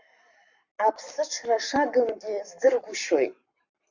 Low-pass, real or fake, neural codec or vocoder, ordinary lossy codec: 7.2 kHz; fake; codec, 44.1 kHz, 7.8 kbps, Pupu-Codec; Opus, 64 kbps